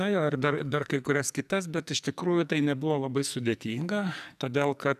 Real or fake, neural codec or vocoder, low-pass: fake; codec, 44.1 kHz, 2.6 kbps, SNAC; 14.4 kHz